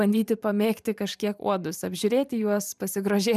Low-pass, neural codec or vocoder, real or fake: 14.4 kHz; vocoder, 44.1 kHz, 128 mel bands every 512 samples, BigVGAN v2; fake